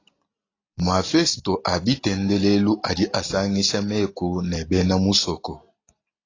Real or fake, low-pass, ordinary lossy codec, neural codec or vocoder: real; 7.2 kHz; AAC, 32 kbps; none